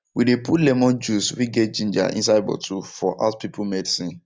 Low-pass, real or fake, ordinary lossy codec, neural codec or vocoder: none; real; none; none